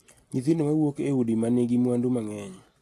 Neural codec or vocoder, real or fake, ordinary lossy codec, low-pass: none; real; AAC, 48 kbps; 14.4 kHz